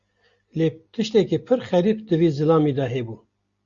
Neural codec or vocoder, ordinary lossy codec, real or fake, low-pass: none; Opus, 64 kbps; real; 7.2 kHz